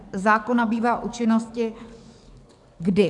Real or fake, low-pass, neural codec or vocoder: fake; 10.8 kHz; codec, 44.1 kHz, 7.8 kbps, DAC